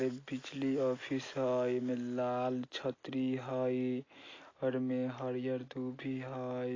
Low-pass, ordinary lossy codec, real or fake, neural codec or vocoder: 7.2 kHz; AAC, 32 kbps; real; none